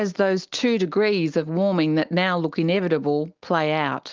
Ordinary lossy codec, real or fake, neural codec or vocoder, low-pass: Opus, 32 kbps; real; none; 7.2 kHz